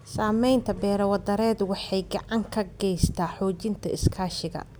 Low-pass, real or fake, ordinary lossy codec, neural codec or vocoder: none; real; none; none